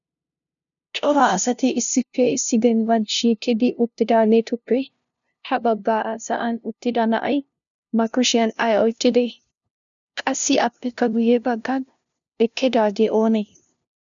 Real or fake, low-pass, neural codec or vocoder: fake; 7.2 kHz; codec, 16 kHz, 0.5 kbps, FunCodec, trained on LibriTTS, 25 frames a second